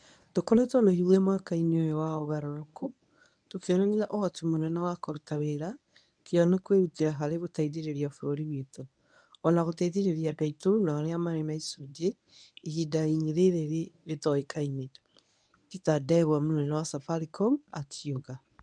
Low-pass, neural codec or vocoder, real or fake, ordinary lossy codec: 9.9 kHz; codec, 24 kHz, 0.9 kbps, WavTokenizer, medium speech release version 1; fake; none